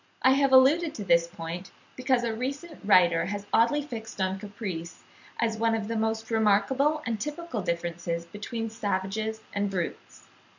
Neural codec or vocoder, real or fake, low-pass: none; real; 7.2 kHz